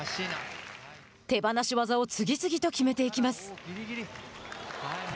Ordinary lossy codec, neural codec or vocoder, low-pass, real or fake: none; none; none; real